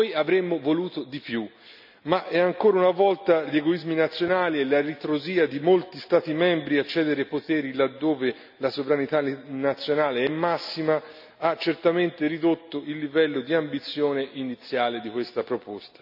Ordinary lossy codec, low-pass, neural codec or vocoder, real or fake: none; 5.4 kHz; none; real